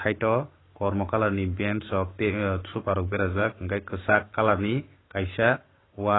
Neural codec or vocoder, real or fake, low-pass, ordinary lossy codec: codec, 44.1 kHz, 7.8 kbps, Pupu-Codec; fake; 7.2 kHz; AAC, 16 kbps